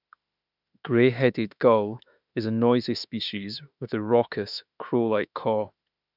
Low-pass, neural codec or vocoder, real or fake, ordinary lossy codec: 5.4 kHz; autoencoder, 48 kHz, 32 numbers a frame, DAC-VAE, trained on Japanese speech; fake; none